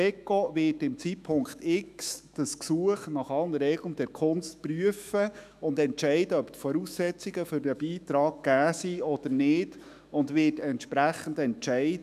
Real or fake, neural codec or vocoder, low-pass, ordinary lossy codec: fake; autoencoder, 48 kHz, 128 numbers a frame, DAC-VAE, trained on Japanese speech; 14.4 kHz; none